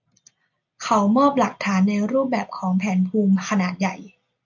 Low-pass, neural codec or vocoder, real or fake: 7.2 kHz; none; real